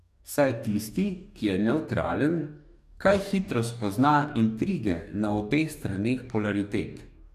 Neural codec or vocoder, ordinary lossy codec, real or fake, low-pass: codec, 44.1 kHz, 2.6 kbps, DAC; none; fake; 14.4 kHz